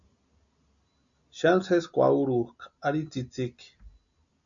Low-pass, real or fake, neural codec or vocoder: 7.2 kHz; real; none